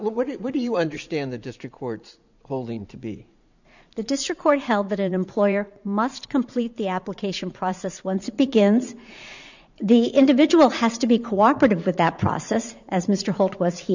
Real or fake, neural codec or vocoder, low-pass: fake; vocoder, 44.1 kHz, 80 mel bands, Vocos; 7.2 kHz